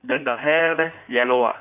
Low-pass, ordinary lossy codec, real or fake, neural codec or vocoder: 3.6 kHz; none; fake; codec, 16 kHz in and 24 kHz out, 1.1 kbps, FireRedTTS-2 codec